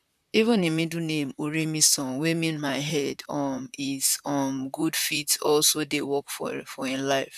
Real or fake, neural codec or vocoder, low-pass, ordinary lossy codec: fake; vocoder, 44.1 kHz, 128 mel bands, Pupu-Vocoder; 14.4 kHz; none